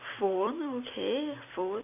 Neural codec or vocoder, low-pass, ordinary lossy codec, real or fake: vocoder, 44.1 kHz, 128 mel bands every 256 samples, BigVGAN v2; 3.6 kHz; none; fake